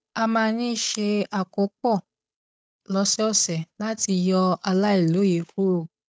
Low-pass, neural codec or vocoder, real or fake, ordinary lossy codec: none; codec, 16 kHz, 8 kbps, FunCodec, trained on Chinese and English, 25 frames a second; fake; none